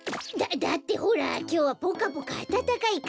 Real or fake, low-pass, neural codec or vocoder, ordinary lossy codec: real; none; none; none